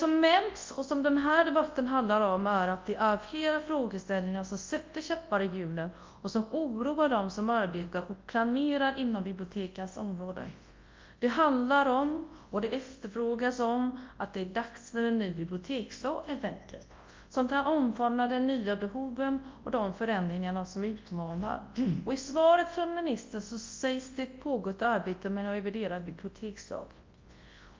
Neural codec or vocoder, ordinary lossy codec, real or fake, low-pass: codec, 24 kHz, 0.9 kbps, WavTokenizer, large speech release; Opus, 32 kbps; fake; 7.2 kHz